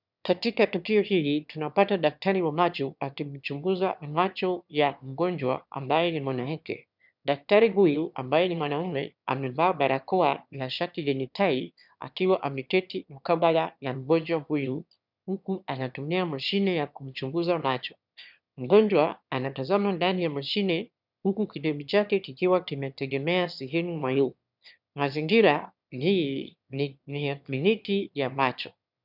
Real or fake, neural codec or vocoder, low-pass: fake; autoencoder, 22.05 kHz, a latent of 192 numbers a frame, VITS, trained on one speaker; 5.4 kHz